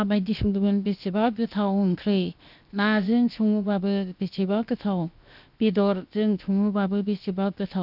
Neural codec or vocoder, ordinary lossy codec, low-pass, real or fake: codec, 16 kHz, 0.7 kbps, FocalCodec; none; 5.4 kHz; fake